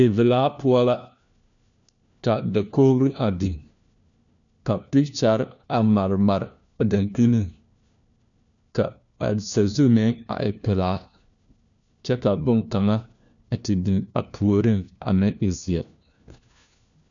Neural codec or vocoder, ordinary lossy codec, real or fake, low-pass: codec, 16 kHz, 1 kbps, FunCodec, trained on LibriTTS, 50 frames a second; MP3, 96 kbps; fake; 7.2 kHz